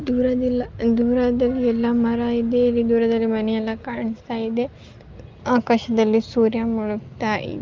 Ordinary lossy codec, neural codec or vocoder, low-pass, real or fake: Opus, 32 kbps; none; 7.2 kHz; real